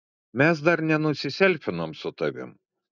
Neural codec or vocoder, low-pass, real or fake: none; 7.2 kHz; real